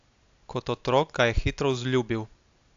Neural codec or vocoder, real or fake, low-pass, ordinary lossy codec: none; real; 7.2 kHz; AAC, 64 kbps